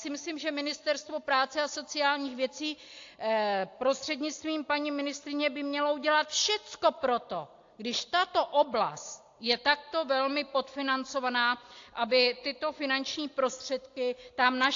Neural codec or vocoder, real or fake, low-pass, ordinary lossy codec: none; real; 7.2 kHz; AAC, 48 kbps